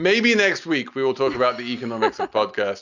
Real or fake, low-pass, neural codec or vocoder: real; 7.2 kHz; none